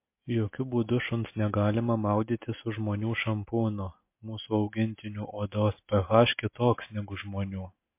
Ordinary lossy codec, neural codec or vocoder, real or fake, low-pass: MP3, 24 kbps; none; real; 3.6 kHz